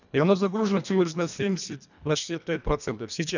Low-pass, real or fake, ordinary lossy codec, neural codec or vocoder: 7.2 kHz; fake; none; codec, 24 kHz, 1.5 kbps, HILCodec